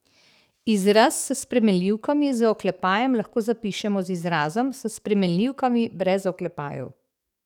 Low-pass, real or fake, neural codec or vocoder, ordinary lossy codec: 19.8 kHz; fake; codec, 44.1 kHz, 7.8 kbps, DAC; none